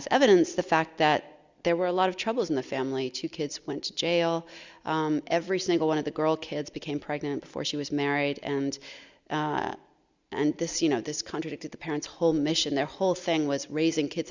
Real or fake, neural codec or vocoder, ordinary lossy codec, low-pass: real; none; Opus, 64 kbps; 7.2 kHz